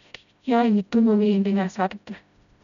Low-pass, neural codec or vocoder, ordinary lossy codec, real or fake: 7.2 kHz; codec, 16 kHz, 0.5 kbps, FreqCodec, smaller model; none; fake